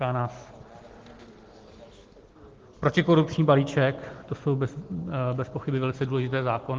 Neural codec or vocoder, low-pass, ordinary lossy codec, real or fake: none; 7.2 kHz; Opus, 16 kbps; real